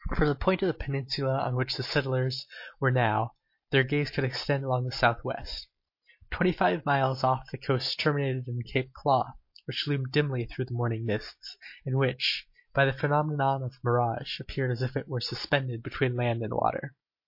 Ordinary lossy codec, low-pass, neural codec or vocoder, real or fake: MP3, 32 kbps; 5.4 kHz; none; real